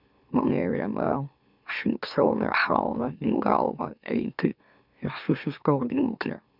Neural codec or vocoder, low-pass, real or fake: autoencoder, 44.1 kHz, a latent of 192 numbers a frame, MeloTTS; 5.4 kHz; fake